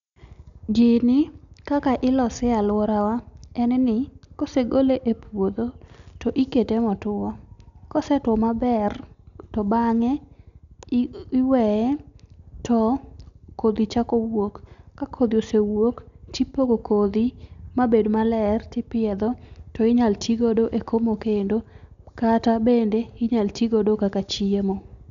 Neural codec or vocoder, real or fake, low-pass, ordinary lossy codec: none; real; 7.2 kHz; none